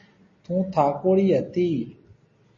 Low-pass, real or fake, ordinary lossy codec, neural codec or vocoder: 7.2 kHz; real; MP3, 32 kbps; none